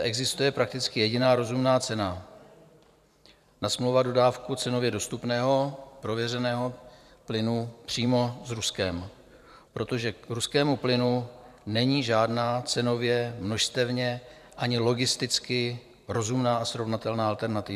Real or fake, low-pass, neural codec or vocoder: fake; 14.4 kHz; vocoder, 44.1 kHz, 128 mel bands every 512 samples, BigVGAN v2